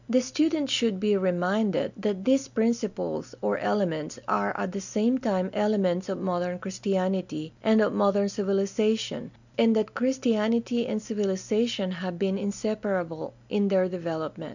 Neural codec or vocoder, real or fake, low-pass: none; real; 7.2 kHz